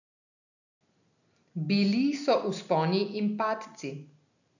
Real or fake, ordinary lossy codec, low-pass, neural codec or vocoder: real; none; 7.2 kHz; none